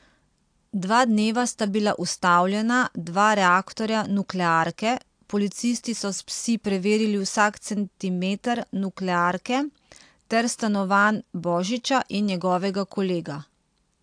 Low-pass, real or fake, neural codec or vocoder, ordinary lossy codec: 9.9 kHz; real; none; AAC, 64 kbps